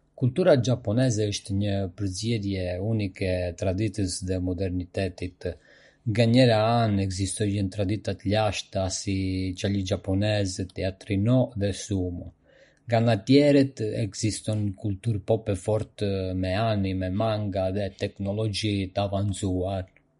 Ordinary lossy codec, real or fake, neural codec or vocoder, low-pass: MP3, 48 kbps; real; none; 10.8 kHz